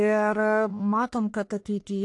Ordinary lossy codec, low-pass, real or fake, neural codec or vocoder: AAC, 48 kbps; 10.8 kHz; fake; codec, 44.1 kHz, 1.7 kbps, Pupu-Codec